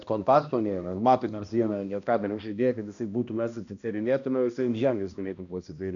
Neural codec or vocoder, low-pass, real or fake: codec, 16 kHz, 1 kbps, X-Codec, HuBERT features, trained on balanced general audio; 7.2 kHz; fake